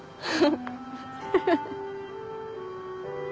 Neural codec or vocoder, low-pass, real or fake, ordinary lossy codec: none; none; real; none